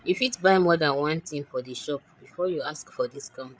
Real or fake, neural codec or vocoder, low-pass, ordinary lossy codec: fake; codec, 16 kHz, 8 kbps, FreqCodec, larger model; none; none